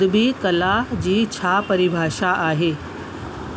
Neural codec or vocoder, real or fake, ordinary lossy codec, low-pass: none; real; none; none